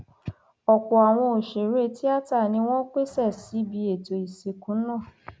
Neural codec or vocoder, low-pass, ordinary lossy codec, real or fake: none; none; none; real